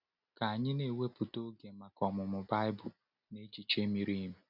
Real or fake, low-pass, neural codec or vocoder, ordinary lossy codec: real; 5.4 kHz; none; none